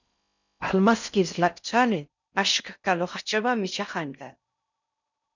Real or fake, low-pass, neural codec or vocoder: fake; 7.2 kHz; codec, 16 kHz in and 24 kHz out, 0.6 kbps, FocalCodec, streaming, 4096 codes